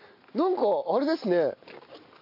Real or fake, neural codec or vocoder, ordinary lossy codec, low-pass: real; none; AAC, 32 kbps; 5.4 kHz